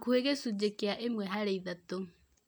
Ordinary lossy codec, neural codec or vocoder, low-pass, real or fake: none; none; none; real